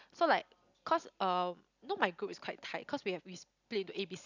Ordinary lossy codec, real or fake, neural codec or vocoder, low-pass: none; real; none; 7.2 kHz